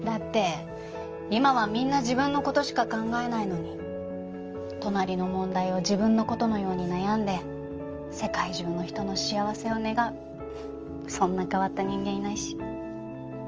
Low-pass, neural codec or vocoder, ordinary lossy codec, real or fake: 7.2 kHz; none; Opus, 24 kbps; real